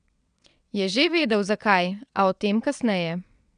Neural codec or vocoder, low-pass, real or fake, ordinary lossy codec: vocoder, 22.05 kHz, 80 mel bands, WaveNeXt; 9.9 kHz; fake; none